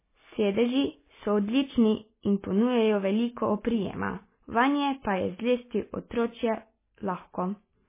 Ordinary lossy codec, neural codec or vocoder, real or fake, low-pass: MP3, 16 kbps; none; real; 3.6 kHz